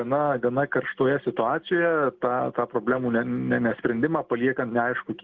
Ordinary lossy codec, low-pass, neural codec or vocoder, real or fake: Opus, 16 kbps; 7.2 kHz; none; real